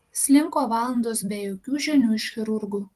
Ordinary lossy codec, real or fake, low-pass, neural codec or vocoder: Opus, 24 kbps; fake; 14.4 kHz; vocoder, 44.1 kHz, 128 mel bands every 256 samples, BigVGAN v2